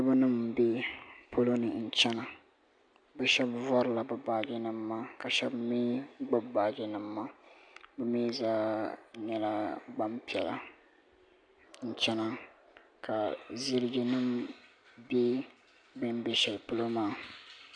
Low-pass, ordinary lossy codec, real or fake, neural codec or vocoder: 9.9 kHz; AAC, 64 kbps; real; none